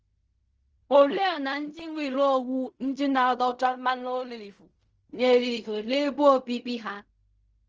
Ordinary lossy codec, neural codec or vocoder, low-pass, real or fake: Opus, 24 kbps; codec, 16 kHz in and 24 kHz out, 0.4 kbps, LongCat-Audio-Codec, fine tuned four codebook decoder; 7.2 kHz; fake